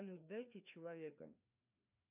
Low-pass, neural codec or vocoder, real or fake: 3.6 kHz; codec, 16 kHz, 2 kbps, FreqCodec, larger model; fake